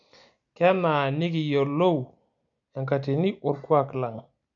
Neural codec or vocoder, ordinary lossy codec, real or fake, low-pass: none; MP3, 64 kbps; real; 7.2 kHz